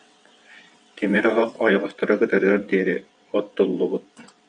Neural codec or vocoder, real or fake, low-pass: vocoder, 22.05 kHz, 80 mel bands, WaveNeXt; fake; 9.9 kHz